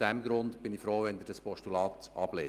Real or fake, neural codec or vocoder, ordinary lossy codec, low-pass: real; none; Opus, 32 kbps; 14.4 kHz